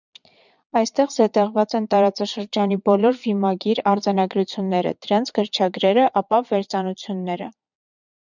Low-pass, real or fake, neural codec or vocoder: 7.2 kHz; fake; vocoder, 22.05 kHz, 80 mel bands, Vocos